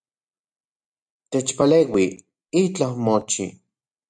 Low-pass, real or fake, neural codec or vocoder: 9.9 kHz; real; none